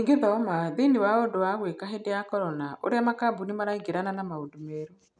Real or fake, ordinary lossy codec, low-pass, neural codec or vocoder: real; none; 9.9 kHz; none